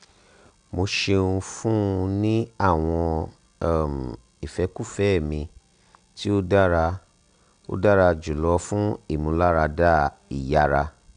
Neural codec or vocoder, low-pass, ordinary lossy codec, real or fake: none; 9.9 kHz; none; real